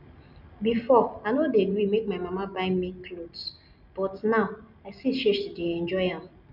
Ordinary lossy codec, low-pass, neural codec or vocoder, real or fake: none; 5.4 kHz; none; real